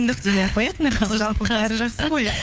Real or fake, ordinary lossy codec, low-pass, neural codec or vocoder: fake; none; none; codec, 16 kHz, 4 kbps, FunCodec, trained on LibriTTS, 50 frames a second